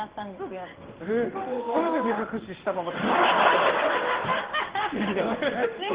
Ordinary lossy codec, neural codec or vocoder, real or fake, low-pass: Opus, 16 kbps; codec, 16 kHz in and 24 kHz out, 1 kbps, XY-Tokenizer; fake; 3.6 kHz